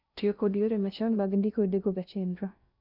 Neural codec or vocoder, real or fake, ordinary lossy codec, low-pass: codec, 16 kHz in and 24 kHz out, 0.6 kbps, FocalCodec, streaming, 2048 codes; fake; none; 5.4 kHz